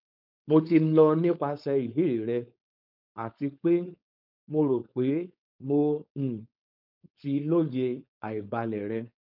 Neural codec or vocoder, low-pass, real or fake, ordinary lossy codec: codec, 16 kHz, 4.8 kbps, FACodec; 5.4 kHz; fake; none